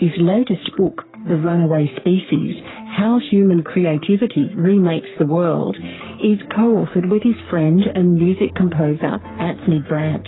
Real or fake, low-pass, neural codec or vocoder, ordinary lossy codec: fake; 7.2 kHz; codec, 44.1 kHz, 3.4 kbps, Pupu-Codec; AAC, 16 kbps